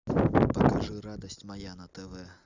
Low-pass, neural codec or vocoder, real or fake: 7.2 kHz; none; real